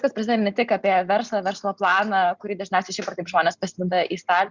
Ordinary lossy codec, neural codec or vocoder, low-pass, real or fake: Opus, 64 kbps; none; 7.2 kHz; real